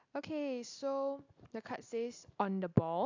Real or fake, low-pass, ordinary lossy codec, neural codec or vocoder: real; 7.2 kHz; AAC, 48 kbps; none